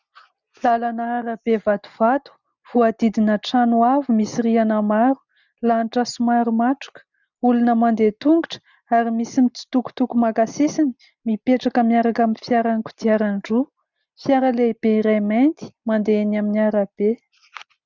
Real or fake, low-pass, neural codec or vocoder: real; 7.2 kHz; none